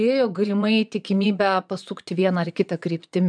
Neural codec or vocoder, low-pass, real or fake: vocoder, 22.05 kHz, 80 mel bands, Vocos; 9.9 kHz; fake